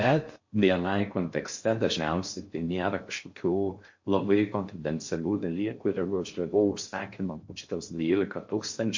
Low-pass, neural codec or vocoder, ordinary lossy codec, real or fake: 7.2 kHz; codec, 16 kHz in and 24 kHz out, 0.6 kbps, FocalCodec, streaming, 4096 codes; MP3, 48 kbps; fake